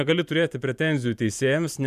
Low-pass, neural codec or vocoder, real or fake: 14.4 kHz; vocoder, 44.1 kHz, 128 mel bands every 512 samples, BigVGAN v2; fake